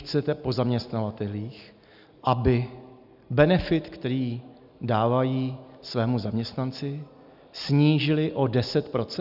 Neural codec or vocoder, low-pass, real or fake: none; 5.4 kHz; real